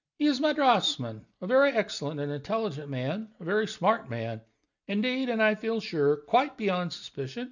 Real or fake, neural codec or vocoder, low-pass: real; none; 7.2 kHz